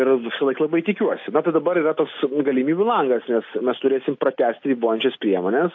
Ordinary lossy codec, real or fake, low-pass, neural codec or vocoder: AAC, 48 kbps; real; 7.2 kHz; none